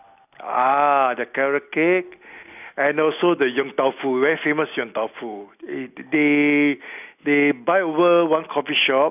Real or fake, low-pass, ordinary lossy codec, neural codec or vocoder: real; 3.6 kHz; none; none